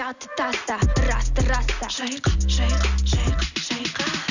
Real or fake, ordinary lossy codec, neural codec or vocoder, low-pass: real; none; none; 7.2 kHz